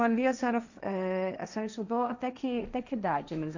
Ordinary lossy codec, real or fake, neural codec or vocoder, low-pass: none; fake; codec, 16 kHz, 1.1 kbps, Voila-Tokenizer; 7.2 kHz